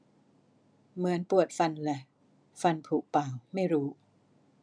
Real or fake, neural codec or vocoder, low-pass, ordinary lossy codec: real; none; 9.9 kHz; none